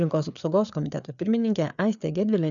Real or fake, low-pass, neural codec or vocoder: fake; 7.2 kHz; codec, 16 kHz, 4 kbps, FreqCodec, larger model